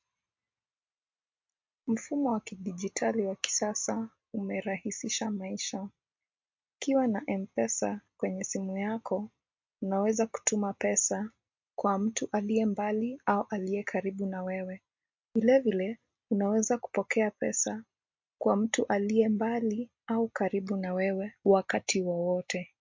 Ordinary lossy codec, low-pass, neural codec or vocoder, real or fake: MP3, 48 kbps; 7.2 kHz; none; real